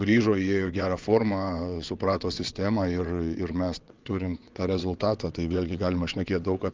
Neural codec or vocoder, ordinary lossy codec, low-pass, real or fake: vocoder, 22.05 kHz, 80 mel bands, WaveNeXt; Opus, 24 kbps; 7.2 kHz; fake